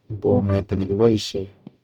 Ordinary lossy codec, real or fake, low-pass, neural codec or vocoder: none; fake; 19.8 kHz; codec, 44.1 kHz, 0.9 kbps, DAC